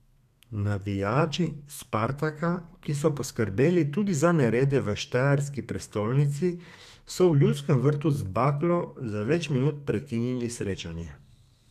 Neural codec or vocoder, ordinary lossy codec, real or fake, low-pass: codec, 32 kHz, 1.9 kbps, SNAC; none; fake; 14.4 kHz